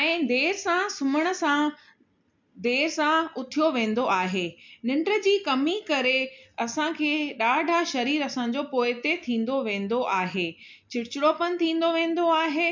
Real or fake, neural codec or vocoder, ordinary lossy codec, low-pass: real; none; MP3, 64 kbps; 7.2 kHz